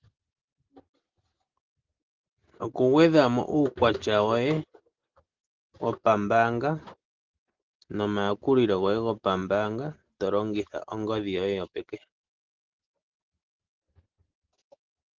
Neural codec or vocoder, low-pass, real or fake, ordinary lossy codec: none; 7.2 kHz; real; Opus, 16 kbps